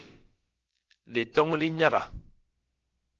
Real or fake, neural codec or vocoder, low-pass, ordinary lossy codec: fake; codec, 16 kHz, about 1 kbps, DyCAST, with the encoder's durations; 7.2 kHz; Opus, 16 kbps